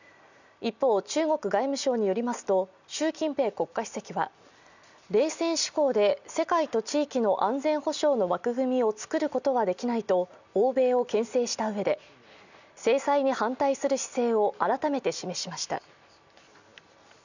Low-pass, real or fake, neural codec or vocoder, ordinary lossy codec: 7.2 kHz; real; none; none